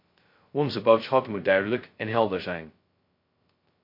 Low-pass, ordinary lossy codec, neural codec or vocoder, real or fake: 5.4 kHz; MP3, 32 kbps; codec, 16 kHz, 0.2 kbps, FocalCodec; fake